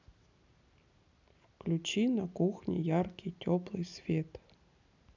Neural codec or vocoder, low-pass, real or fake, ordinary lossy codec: none; 7.2 kHz; real; none